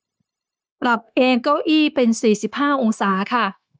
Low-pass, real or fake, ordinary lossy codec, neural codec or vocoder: none; fake; none; codec, 16 kHz, 0.9 kbps, LongCat-Audio-Codec